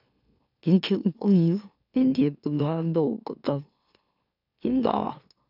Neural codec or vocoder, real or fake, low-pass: autoencoder, 44.1 kHz, a latent of 192 numbers a frame, MeloTTS; fake; 5.4 kHz